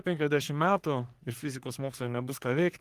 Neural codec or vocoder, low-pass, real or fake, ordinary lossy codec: codec, 44.1 kHz, 3.4 kbps, Pupu-Codec; 14.4 kHz; fake; Opus, 24 kbps